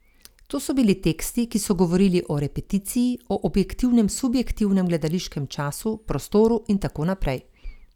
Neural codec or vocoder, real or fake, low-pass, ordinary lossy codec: none; real; 19.8 kHz; none